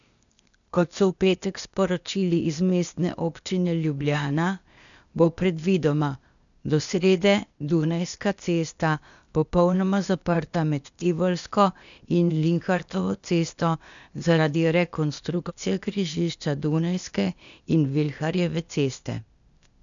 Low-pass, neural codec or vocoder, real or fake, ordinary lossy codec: 7.2 kHz; codec, 16 kHz, 0.8 kbps, ZipCodec; fake; none